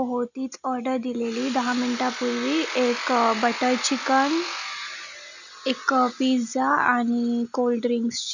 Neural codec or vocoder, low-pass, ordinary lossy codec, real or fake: none; 7.2 kHz; none; real